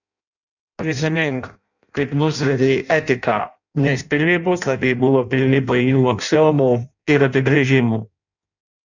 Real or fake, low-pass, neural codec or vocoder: fake; 7.2 kHz; codec, 16 kHz in and 24 kHz out, 0.6 kbps, FireRedTTS-2 codec